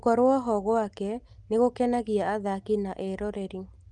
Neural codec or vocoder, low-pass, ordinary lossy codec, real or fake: none; 10.8 kHz; Opus, 24 kbps; real